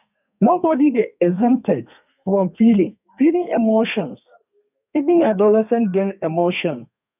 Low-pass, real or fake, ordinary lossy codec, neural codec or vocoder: 3.6 kHz; fake; none; codec, 32 kHz, 1.9 kbps, SNAC